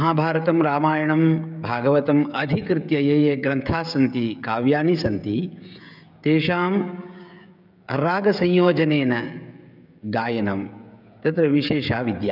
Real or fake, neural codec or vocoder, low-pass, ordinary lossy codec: fake; codec, 16 kHz, 16 kbps, FreqCodec, smaller model; 5.4 kHz; none